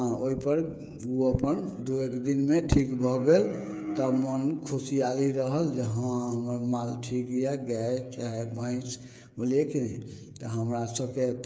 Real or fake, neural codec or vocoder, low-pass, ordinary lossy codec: fake; codec, 16 kHz, 8 kbps, FreqCodec, smaller model; none; none